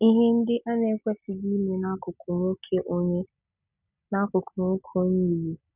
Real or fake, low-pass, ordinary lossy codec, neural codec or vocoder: real; 3.6 kHz; none; none